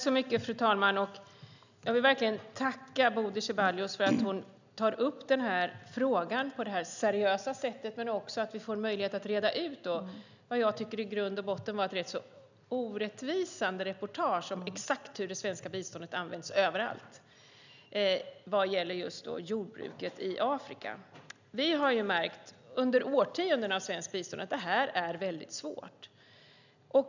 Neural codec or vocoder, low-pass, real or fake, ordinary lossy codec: none; 7.2 kHz; real; none